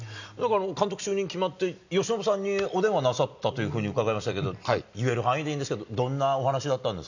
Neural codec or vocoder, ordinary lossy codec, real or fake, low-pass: none; none; real; 7.2 kHz